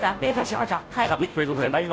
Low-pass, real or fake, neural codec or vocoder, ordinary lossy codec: none; fake; codec, 16 kHz, 0.5 kbps, FunCodec, trained on Chinese and English, 25 frames a second; none